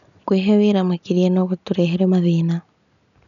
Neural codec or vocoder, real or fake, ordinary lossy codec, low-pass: none; real; none; 7.2 kHz